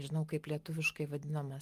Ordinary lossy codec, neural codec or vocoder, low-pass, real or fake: Opus, 32 kbps; none; 14.4 kHz; real